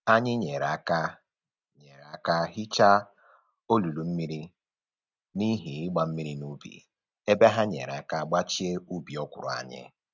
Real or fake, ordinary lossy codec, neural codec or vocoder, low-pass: real; none; none; 7.2 kHz